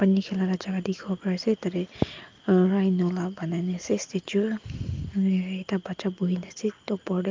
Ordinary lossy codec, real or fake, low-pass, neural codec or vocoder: Opus, 32 kbps; real; 7.2 kHz; none